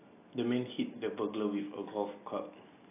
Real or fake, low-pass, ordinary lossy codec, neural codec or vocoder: real; 3.6 kHz; AAC, 24 kbps; none